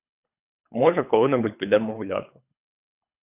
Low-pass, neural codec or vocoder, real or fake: 3.6 kHz; codec, 24 kHz, 3 kbps, HILCodec; fake